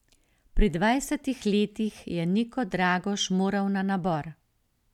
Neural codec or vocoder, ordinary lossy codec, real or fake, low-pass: none; none; real; 19.8 kHz